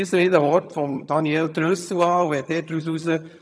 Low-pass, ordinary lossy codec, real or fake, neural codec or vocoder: none; none; fake; vocoder, 22.05 kHz, 80 mel bands, HiFi-GAN